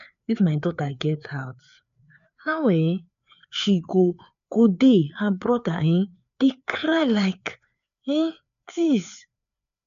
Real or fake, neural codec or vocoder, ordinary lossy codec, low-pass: fake; codec, 16 kHz, 4 kbps, FreqCodec, larger model; none; 7.2 kHz